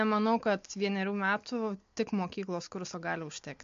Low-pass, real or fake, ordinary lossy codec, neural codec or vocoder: 7.2 kHz; real; MP3, 48 kbps; none